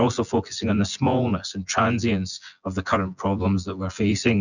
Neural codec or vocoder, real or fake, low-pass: vocoder, 24 kHz, 100 mel bands, Vocos; fake; 7.2 kHz